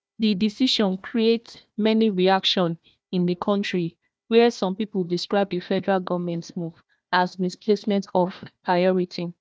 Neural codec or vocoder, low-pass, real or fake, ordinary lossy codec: codec, 16 kHz, 1 kbps, FunCodec, trained on Chinese and English, 50 frames a second; none; fake; none